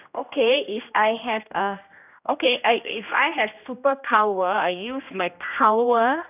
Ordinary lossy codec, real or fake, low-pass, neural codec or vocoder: none; fake; 3.6 kHz; codec, 16 kHz, 1 kbps, X-Codec, HuBERT features, trained on general audio